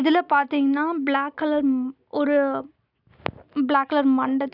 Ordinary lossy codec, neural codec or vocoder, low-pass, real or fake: none; none; 5.4 kHz; real